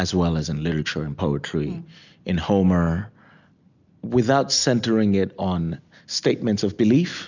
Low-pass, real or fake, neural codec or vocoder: 7.2 kHz; real; none